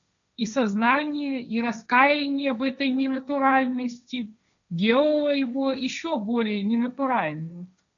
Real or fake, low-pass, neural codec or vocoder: fake; 7.2 kHz; codec, 16 kHz, 1.1 kbps, Voila-Tokenizer